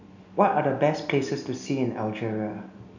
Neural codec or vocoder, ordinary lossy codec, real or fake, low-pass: none; none; real; 7.2 kHz